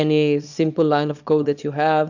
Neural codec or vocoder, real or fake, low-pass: codec, 24 kHz, 0.9 kbps, WavTokenizer, small release; fake; 7.2 kHz